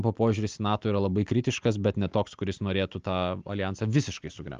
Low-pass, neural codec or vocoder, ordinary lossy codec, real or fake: 7.2 kHz; none; Opus, 32 kbps; real